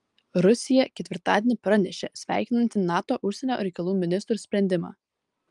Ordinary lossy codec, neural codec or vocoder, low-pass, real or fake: Opus, 32 kbps; none; 10.8 kHz; real